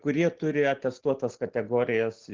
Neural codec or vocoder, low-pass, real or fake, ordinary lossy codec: none; 7.2 kHz; real; Opus, 16 kbps